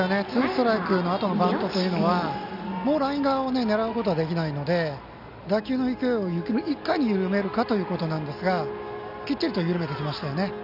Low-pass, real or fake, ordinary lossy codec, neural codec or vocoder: 5.4 kHz; real; none; none